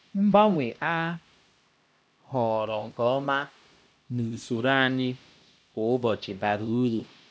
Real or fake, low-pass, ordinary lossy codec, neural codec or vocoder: fake; none; none; codec, 16 kHz, 1 kbps, X-Codec, HuBERT features, trained on LibriSpeech